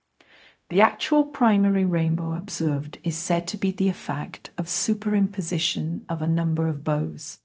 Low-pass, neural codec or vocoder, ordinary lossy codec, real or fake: none; codec, 16 kHz, 0.4 kbps, LongCat-Audio-Codec; none; fake